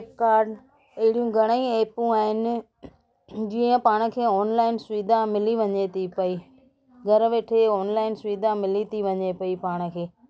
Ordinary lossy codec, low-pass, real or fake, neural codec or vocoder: none; none; real; none